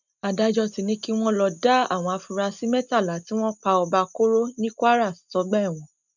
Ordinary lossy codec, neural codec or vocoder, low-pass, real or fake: none; none; 7.2 kHz; real